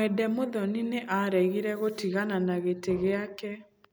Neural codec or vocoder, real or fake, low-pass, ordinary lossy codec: none; real; none; none